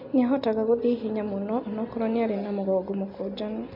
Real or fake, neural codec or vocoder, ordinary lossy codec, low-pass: real; none; MP3, 32 kbps; 5.4 kHz